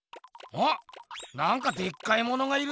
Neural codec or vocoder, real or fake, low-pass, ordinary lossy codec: none; real; none; none